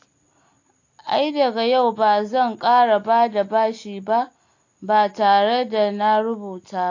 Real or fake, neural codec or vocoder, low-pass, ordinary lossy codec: real; none; 7.2 kHz; AAC, 48 kbps